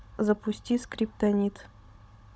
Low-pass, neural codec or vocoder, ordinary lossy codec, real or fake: none; codec, 16 kHz, 16 kbps, FunCodec, trained on Chinese and English, 50 frames a second; none; fake